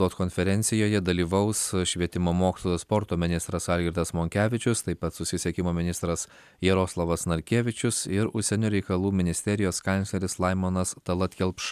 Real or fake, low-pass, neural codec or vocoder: real; 14.4 kHz; none